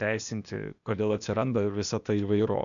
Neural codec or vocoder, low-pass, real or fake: codec, 16 kHz, 0.8 kbps, ZipCodec; 7.2 kHz; fake